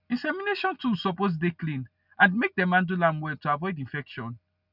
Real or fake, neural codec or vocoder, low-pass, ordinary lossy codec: real; none; 5.4 kHz; none